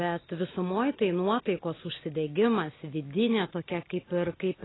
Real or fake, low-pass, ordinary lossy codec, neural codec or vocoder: real; 7.2 kHz; AAC, 16 kbps; none